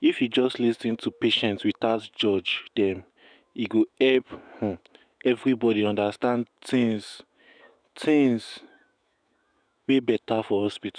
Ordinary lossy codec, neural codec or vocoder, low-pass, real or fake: none; autoencoder, 48 kHz, 128 numbers a frame, DAC-VAE, trained on Japanese speech; 9.9 kHz; fake